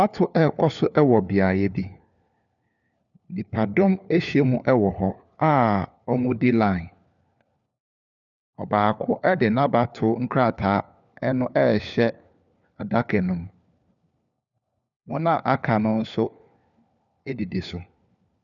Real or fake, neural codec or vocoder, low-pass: fake; codec, 16 kHz, 4 kbps, FunCodec, trained on LibriTTS, 50 frames a second; 7.2 kHz